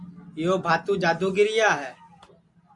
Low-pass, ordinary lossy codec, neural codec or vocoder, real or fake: 10.8 kHz; MP3, 64 kbps; none; real